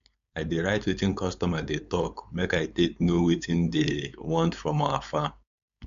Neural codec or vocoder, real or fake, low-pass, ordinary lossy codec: codec, 16 kHz, 4.8 kbps, FACodec; fake; 7.2 kHz; AAC, 96 kbps